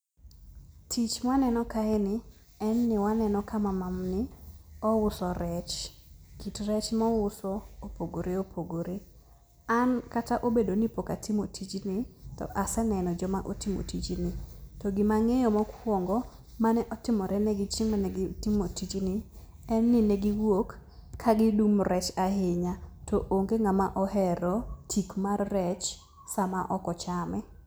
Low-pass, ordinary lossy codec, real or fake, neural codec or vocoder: none; none; real; none